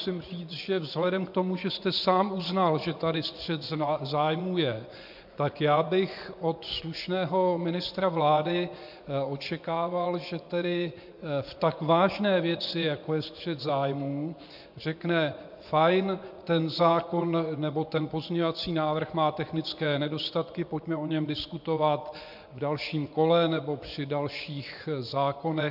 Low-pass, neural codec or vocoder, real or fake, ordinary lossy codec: 5.4 kHz; vocoder, 24 kHz, 100 mel bands, Vocos; fake; MP3, 48 kbps